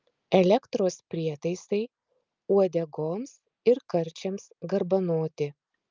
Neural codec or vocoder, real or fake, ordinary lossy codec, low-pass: none; real; Opus, 24 kbps; 7.2 kHz